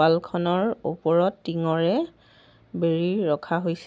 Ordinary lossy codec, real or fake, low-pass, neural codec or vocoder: none; real; none; none